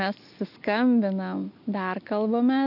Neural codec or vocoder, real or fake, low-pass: none; real; 5.4 kHz